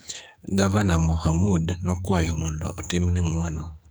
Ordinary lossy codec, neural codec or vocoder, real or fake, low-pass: none; codec, 44.1 kHz, 2.6 kbps, SNAC; fake; none